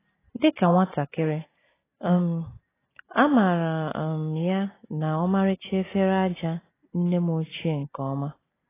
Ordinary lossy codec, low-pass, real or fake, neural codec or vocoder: AAC, 16 kbps; 3.6 kHz; real; none